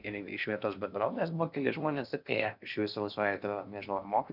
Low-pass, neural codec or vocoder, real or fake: 5.4 kHz; codec, 16 kHz in and 24 kHz out, 0.8 kbps, FocalCodec, streaming, 65536 codes; fake